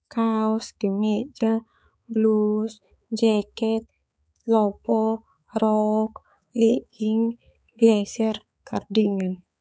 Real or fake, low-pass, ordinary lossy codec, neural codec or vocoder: fake; none; none; codec, 16 kHz, 4 kbps, X-Codec, HuBERT features, trained on balanced general audio